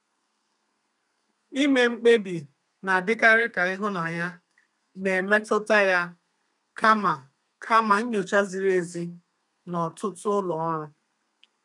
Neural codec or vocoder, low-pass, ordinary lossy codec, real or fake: codec, 32 kHz, 1.9 kbps, SNAC; 10.8 kHz; none; fake